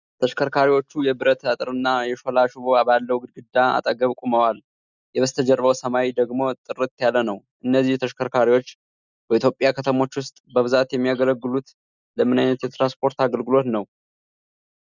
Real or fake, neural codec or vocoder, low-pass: real; none; 7.2 kHz